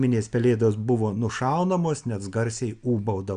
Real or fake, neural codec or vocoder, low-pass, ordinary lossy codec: real; none; 9.9 kHz; Opus, 64 kbps